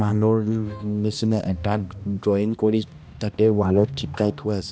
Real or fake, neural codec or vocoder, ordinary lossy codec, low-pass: fake; codec, 16 kHz, 1 kbps, X-Codec, HuBERT features, trained on balanced general audio; none; none